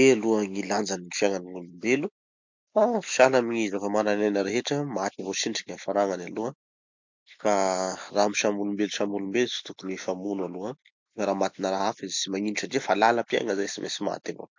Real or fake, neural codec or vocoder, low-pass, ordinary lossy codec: real; none; 7.2 kHz; MP3, 64 kbps